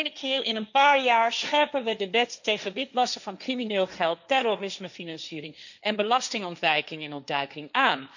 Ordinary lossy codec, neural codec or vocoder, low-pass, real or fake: none; codec, 16 kHz, 1.1 kbps, Voila-Tokenizer; 7.2 kHz; fake